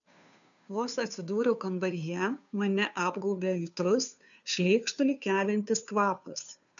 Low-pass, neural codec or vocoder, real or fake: 7.2 kHz; codec, 16 kHz, 2 kbps, FunCodec, trained on Chinese and English, 25 frames a second; fake